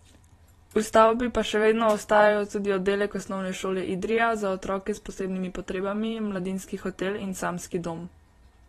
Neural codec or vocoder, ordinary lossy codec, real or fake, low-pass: vocoder, 44.1 kHz, 128 mel bands every 512 samples, BigVGAN v2; AAC, 32 kbps; fake; 19.8 kHz